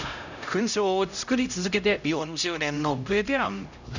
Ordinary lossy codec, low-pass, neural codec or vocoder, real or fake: none; 7.2 kHz; codec, 16 kHz, 0.5 kbps, X-Codec, HuBERT features, trained on LibriSpeech; fake